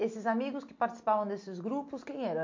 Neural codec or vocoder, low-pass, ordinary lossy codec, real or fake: none; 7.2 kHz; MP3, 48 kbps; real